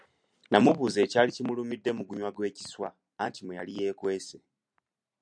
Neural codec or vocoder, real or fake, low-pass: none; real; 9.9 kHz